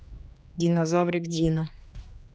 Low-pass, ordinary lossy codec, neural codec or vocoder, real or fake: none; none; codec, 16 kHz, 2 kbps, X-Codec, HuBERT features, trained on general audio; fake